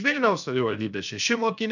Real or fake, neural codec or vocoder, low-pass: fake; codec, 16 kHz, 0.7 kbps, FocalCodec; 7.2 kHz